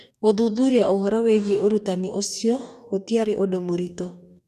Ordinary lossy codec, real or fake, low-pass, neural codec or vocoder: none; fake; 14.4 kHz; codec, 44.1 kHz, 2.6 kbps, DAC